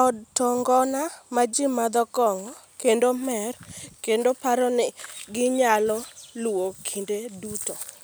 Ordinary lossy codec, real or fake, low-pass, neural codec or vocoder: none; real; none; none